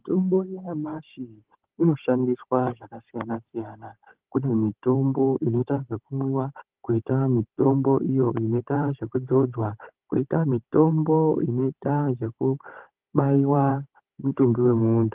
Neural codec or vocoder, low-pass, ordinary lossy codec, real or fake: codec, 16 kHz, 16 kbps, FunCodec, trained on Chinese and English, 50 frames a second; 3.6 kHz; Opus, 16 kbps; fake